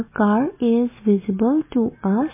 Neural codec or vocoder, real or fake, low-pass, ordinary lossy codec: none; real; 3.6 kHz; MP3, 16 kbps